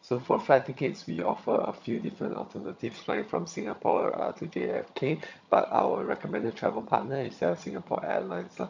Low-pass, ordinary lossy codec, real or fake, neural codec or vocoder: 7.2 kHz; MP3, 64 kbps; fake; vocoder, 22.05 kHz, 80 mel bands, HiFi-GAN